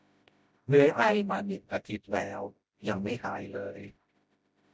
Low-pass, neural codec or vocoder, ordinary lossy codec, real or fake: none; codec, 16 kHz, 0.5 kbps, FreqCodec, smaller model; none; fake